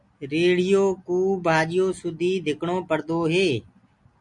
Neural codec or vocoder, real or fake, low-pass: none; real; 10.8 kHz